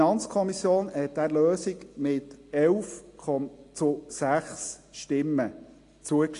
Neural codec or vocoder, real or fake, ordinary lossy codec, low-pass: none; real; AAC, 48 kbps; 10.8 kHz